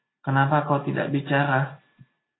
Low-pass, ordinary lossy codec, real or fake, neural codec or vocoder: 7.2 kHz; AAC, 16 kbps; real; none